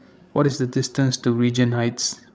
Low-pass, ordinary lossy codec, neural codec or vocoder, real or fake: none; none; codec, 16 kHz, 16 kbps, FreqCodec, larger model; fake